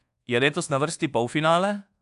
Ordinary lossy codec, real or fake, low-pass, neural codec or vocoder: AAC, 64 kbps; fake; 10.8 kHz; codec, 24 kHz, 1.2 kbps, DualCodec